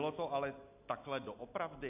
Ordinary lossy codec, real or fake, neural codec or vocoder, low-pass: AAC, 32 kbps; real; none; 3.6 kHz